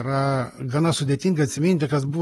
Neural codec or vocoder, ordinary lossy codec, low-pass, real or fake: none; AAC, 32 kbps; 19.8 kHz; real